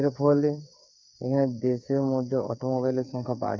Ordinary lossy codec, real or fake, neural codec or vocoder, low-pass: none; fake; codec, 16 kHz, 8 kbps, FreqCodec, smaller model; none